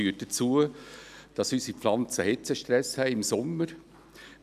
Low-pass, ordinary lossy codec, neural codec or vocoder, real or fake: 14.4 kHz; none; none; real